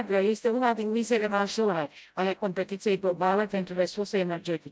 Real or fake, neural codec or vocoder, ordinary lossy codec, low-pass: fake; codec, 16 kHz, 0.5 kbps, FreqCodec, smaller model; none; none